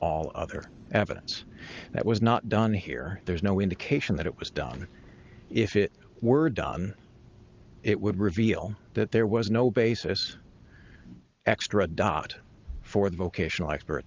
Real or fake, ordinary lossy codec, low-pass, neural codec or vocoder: real; Opus, 24 kbps; 7.2 kHz; none